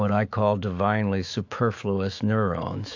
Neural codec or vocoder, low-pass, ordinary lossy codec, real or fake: autoencoder, 48 kHz, 128 numbers a frame, DAC-VAE, trained on Japanese speech; 7.2 kHz; AAC, 48 kbps; fake